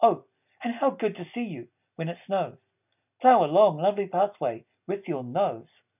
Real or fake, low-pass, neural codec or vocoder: real; 3.6 kHz; none